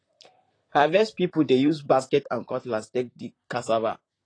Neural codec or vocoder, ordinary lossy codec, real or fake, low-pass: vocoder, 44.1 kHz, 128 mel bands, Pupu-Vocoder; AAC, 32 kbps; fake; 9.9 kHz